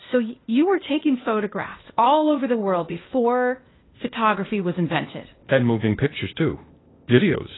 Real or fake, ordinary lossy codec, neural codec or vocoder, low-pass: fake; AAC, 16 kbps; codec, 16 kHz, 0.8 kbps, ZipCodec; 7.2 kHz